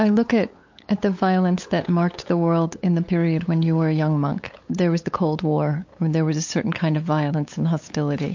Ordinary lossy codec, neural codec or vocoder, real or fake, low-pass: MP3, 48 kbps; codec, 16 kHz, 8 kbps, FunCodec, trained on LibriTTS, 25 frames a second; fake; 7.2 kHz